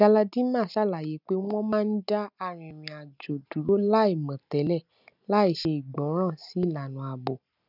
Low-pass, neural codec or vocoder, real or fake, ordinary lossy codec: 5.4 kHz; none; real; none